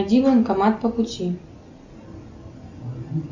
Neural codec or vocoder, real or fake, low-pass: none; real; 7.2 kHz